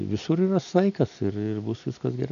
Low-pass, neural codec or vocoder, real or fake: 7.2 kHz; none; real